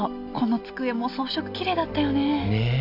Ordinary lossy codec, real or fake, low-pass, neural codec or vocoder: none; real; 5.4 kHz; none